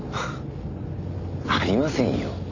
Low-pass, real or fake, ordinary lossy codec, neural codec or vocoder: 7.2 kHz; real; none; none